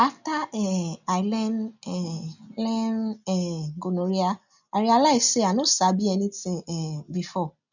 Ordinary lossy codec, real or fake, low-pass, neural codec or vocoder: none; real; 7.2 kHz; none